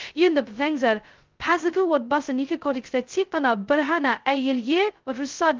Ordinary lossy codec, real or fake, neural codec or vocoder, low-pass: Opus, 32 kbps; fake; codec, 16 kHz, 0.2 kbps, FocalCodec; 7.2 kHz